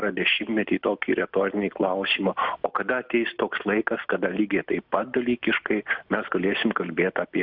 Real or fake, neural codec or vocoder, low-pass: real; none; 5.4 kHz